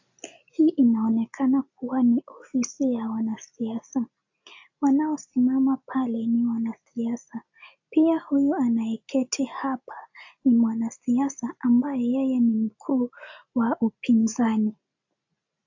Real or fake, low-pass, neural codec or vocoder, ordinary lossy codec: real; 7.2 kHz; none; AAC, 48 kbps